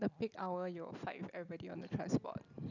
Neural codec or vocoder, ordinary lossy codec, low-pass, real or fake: none; none; 7.2 kHz; real